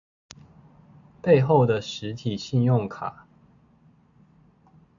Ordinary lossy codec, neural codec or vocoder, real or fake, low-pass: AAC, 64 kbps; none; real; 7.2 kHz